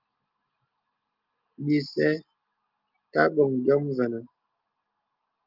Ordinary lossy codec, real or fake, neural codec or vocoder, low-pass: Opus, 32 kbps; real; none; 5.4 kHz